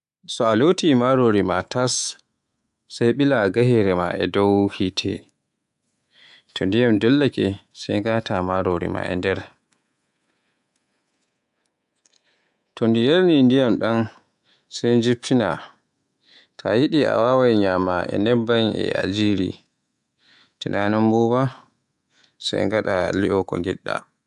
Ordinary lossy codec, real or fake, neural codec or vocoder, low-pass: AAC, 96 kbps; fake; codec, 24 kHz, 3.1 kbps, DualCodec; 10.8 kHz